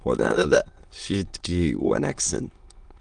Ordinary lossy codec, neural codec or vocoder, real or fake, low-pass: Opus, 24 kbps; autoencoder, 22.05 kHz, a latent of 192 numbers a frame, VITS, trained on many speakers; fake; 9.9 kHz